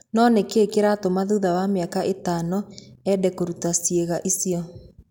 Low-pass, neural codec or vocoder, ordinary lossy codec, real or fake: 19.8 kHz; none; none; real